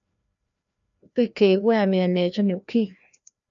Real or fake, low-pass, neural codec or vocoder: fake; 7.2 kHz; codec, 16 kHz, 1 kbps, FreqCodec, larger model